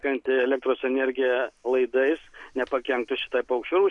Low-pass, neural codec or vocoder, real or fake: 10.8 kHz; none; real